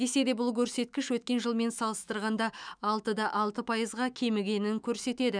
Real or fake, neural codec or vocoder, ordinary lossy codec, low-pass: fake; autoencoder, 48 kHz, 128 numbers a frame, DAC-VAE, trained on Japanese speech; none; 9.9 kHz